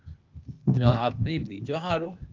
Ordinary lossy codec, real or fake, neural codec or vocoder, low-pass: Opus, 24 kbps; fake; codec, 16 kHz, 0.8 kbps, ZipCodec; 7.2 kHz